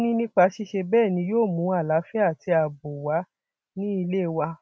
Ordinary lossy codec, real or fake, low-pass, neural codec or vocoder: none; real; none; none